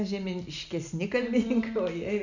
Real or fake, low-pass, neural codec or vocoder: real; 7.2 kHz; none